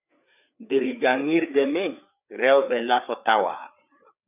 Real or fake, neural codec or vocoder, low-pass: fake; codec, 16 kHz, 4 kbps, FreqCodec, larger model; 3.6 kHz